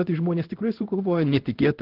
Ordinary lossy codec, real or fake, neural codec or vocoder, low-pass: Opus, 16 kbps; fake; codec, 16 kHz in and 24 kHz out, 1 kbps, XY-Tokenizer; 5.4 kHz